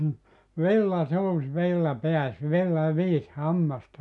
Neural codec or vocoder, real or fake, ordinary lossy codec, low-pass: none; real; none; 10.8 kHz